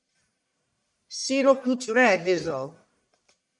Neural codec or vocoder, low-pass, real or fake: codec, 44.1 kHz, 1.7 kbps, Pupu-Codec; 10.8 kHz; fake